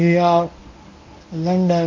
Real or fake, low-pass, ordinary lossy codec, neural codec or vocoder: fake; 7.2 kHz; MP3, 64 kbps; codec, 24 kHz, 0.9 kbps, WavTokenizer, medium speech release version 1